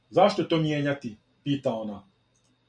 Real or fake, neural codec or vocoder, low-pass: real; none; 9.9 kHz